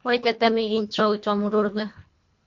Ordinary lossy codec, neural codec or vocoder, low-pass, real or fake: MP3, 48 kbps; codec, 24 kHz, 1.5 kbps, HILCodec; 7.2 kHz; fake